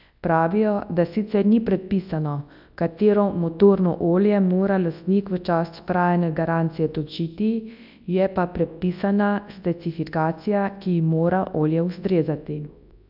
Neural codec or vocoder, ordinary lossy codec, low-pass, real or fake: codec, 24 kHz, 0.9 kbps, WavTokenizer, large speech release; AAC, 48 kbps; 5.4 kHz; fake